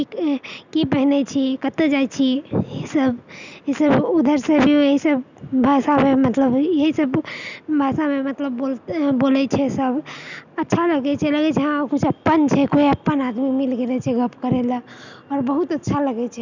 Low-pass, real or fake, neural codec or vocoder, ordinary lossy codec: 7.2 kHz; real; none; none